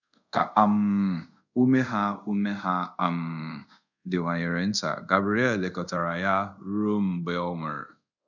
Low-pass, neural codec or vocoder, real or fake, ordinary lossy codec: 7.2 kHz; codec, 24 kHz, 0.5 kbps, DualCodec; fake; none